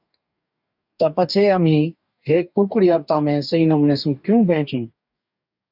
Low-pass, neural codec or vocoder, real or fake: 5.4 kHz; codec, 44.1 kHz, 2.6 kbps, DAC; fake